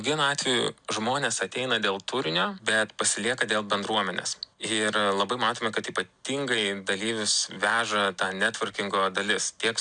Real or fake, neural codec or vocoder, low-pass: real; none; 9.9 kHz